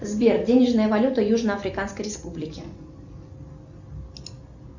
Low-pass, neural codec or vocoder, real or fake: 7.2 kHz; none; real